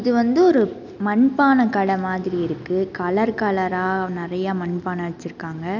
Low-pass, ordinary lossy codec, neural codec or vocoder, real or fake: 7.2 kHz; none; none; real